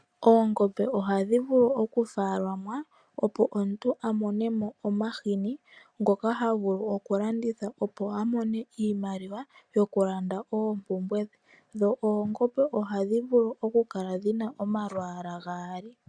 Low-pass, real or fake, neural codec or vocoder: 9.9 kHz; real; none